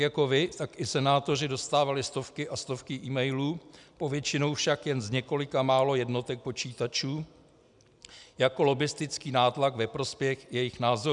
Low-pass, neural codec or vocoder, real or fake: 10.8 kHz; none; real